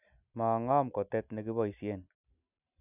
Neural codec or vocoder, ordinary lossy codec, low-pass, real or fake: none; none; 3.6 kHz; real